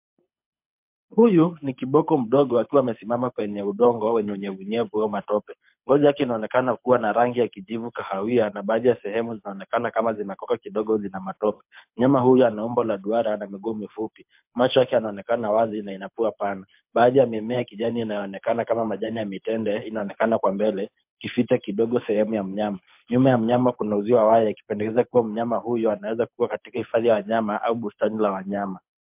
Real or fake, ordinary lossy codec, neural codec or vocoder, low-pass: fake; MP3, 32 kbps; codec, 24 kHz, 6 kbps, HILCodec; 3.6 kHz